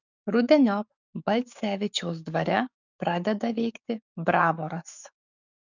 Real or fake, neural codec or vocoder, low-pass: fake; codec, 44.1 kHz, 7.8 kbps, DAC; 7.2 kHz